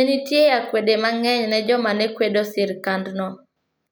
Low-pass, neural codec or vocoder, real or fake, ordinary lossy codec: none; none; real; none